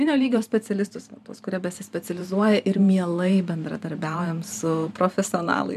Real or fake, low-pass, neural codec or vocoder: fake; 14.4 kHz; vocoder, 44.1 kHz, 128 mel bands every 512 samples, BigVGAN v2